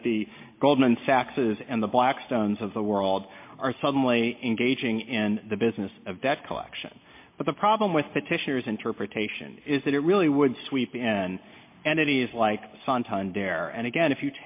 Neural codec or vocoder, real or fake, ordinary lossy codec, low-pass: none; real; MP3, 24 kbps; 3.6 kHz